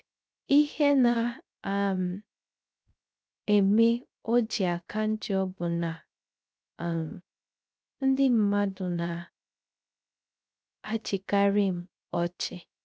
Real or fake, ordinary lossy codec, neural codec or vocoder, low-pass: fake; none; codec, 16 kHz, 0.3 kbps, FocalCodec; none